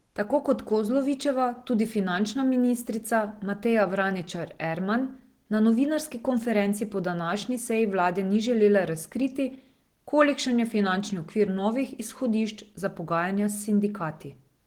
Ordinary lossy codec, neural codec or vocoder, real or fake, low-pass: Opus, 16 kbps; none; real; 19.8 kHz